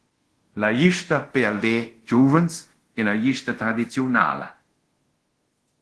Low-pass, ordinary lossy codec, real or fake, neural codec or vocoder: 10.8 kHz; Opus, 16 kbps; fake; codec, 24 kHz, 0.5 kbps, DualCodec